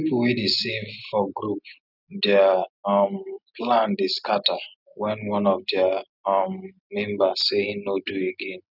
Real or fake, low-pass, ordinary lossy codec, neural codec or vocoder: real; 5.4 kHz; none; none